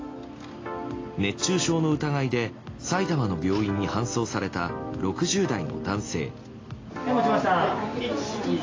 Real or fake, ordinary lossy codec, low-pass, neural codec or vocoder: real; AAC, 32 kbps; 7.2 kHz; none